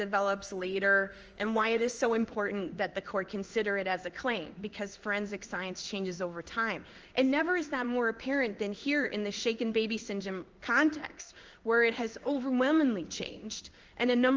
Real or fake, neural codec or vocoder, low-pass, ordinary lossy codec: fake; codec, 16 kHz in and 24 kHz out, 1 kbps, XY-Tokenizer; 7.2 kHz; Opus, 24 kbps